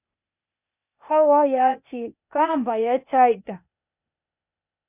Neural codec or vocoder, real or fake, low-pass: codec, 16 kHz, 0.8 kbps, ZipCodec; fake; 3.6 kHz